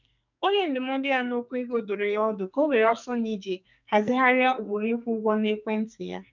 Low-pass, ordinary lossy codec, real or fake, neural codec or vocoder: 7.2 kHz; none; fake; codec, 44.1 kHz, 2.6 kbps, SNAC